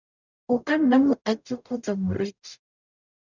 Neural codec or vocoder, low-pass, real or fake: codec, 44.1 kHz, 0.9 kbps, DAC; 7.2 kHz; fake